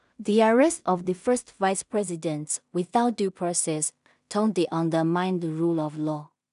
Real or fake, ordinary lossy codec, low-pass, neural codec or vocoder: fake; none; 10.8 kHz; codec, 16 kHz in and 24 kHz out, 0.4 kbps, LongCat-Audio-Codec, two codebook decoder